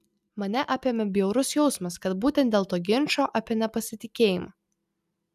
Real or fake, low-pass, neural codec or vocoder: real; 14.4 kHz; none